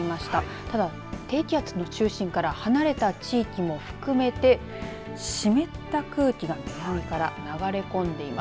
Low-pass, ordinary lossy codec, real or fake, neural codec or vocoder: none; none; real; none